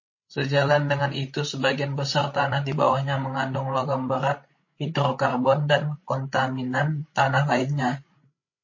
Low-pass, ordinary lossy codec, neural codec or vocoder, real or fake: 7.2 kHz; MP3, 32 kbps; codec, 16 kHz, 16 kbps, FreqCodec, larger model; fake